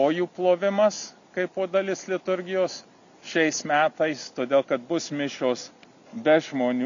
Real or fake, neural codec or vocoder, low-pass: real; none; 7.2 kHz